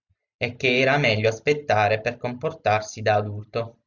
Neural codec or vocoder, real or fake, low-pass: none; real; 7.2 kHz